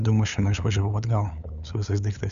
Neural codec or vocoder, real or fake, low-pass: codec, 16 kHz, 8 kbps, FunCodec, trained on LibriTTS, 25 frames a second; fake; 7.2 kHz